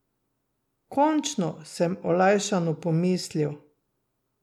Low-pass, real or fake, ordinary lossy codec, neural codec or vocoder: 19.8 kHz; real; none; none